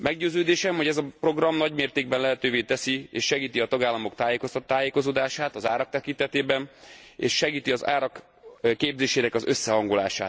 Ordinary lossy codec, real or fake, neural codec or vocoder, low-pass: none; real; none; none